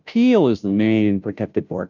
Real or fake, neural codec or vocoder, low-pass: fake; codec, 16 kHz, 0.5 kbps, FunCodec, trained on Chinese and English, 25 frames a second; 7.2 kHz